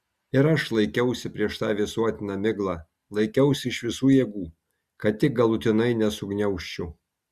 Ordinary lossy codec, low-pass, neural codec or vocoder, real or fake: Opus, 64 kbps; 14.4 kHz; none; real